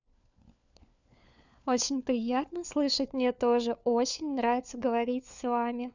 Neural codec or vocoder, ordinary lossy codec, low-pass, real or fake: codec, 16 kHz, 4 kbps, FunCodec, trained on LibriTTS, 50 frames a second; none; 7.2 kHz; fake